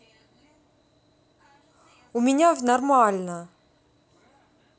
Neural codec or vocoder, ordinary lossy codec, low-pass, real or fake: none; none; none; real